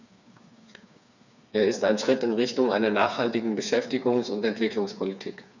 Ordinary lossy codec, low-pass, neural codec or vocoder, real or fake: none; 7.2 kHz; codec, 16 kHz, 4 kbps, FreqCodec, smaller model; fake